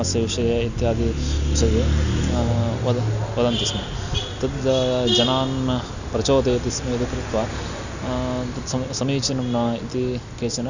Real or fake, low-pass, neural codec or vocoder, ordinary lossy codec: real; 7.2 kHz; none; none